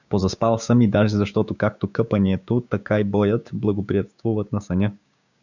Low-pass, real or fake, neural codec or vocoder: 7.2 kHz; fake; codec, 16 kHz, 6 kbps, DAC